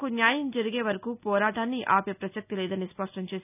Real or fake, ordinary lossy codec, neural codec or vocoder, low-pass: real; none; none; 3.6 kHz